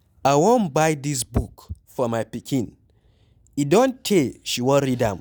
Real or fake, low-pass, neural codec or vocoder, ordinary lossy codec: real; none; none; none